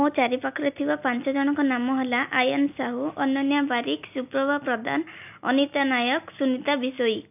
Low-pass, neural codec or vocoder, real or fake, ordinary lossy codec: 3.6 kHz; none; real; none